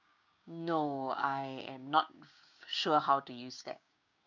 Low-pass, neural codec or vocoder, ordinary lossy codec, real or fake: 7.2 kHz; codec, 16 kHz, 6 kbps, DAC; none; fake